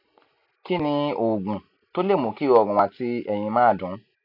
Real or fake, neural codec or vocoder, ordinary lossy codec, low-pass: real; none; none; 5.4 kHz